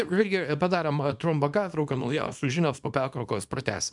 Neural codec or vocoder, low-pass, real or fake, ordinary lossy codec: codec, 24 kHz, 0.9 kbps, WavTokenizer, small release; 10.8 kHz; fake; MP3, 96 kbps